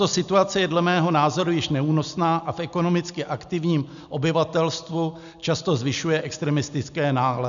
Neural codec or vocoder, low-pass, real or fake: none; 7.2 kHz; real